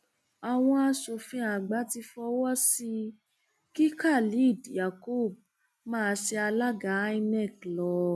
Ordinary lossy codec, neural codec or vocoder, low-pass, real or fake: none; none; none; real